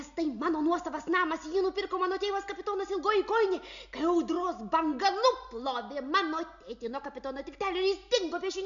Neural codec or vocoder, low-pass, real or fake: none; 7.2 kHz; real